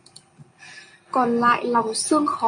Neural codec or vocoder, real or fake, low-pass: none; real; 9.9 kHz